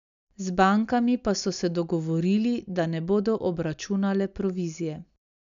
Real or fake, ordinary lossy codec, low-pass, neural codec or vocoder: real; none; 7.2 kHz; none